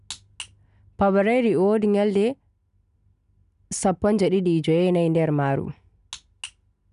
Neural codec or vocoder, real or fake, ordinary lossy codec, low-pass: none; real; none; 10.8 kHz